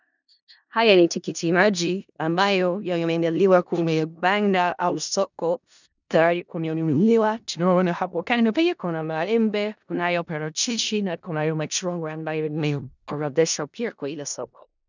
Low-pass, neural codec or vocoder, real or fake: 7.2 kHz; codec, 16 kHz in and 24 kHz out, 0.4 kbps, LongCat-Audio-Codec, four codebook decoder; fake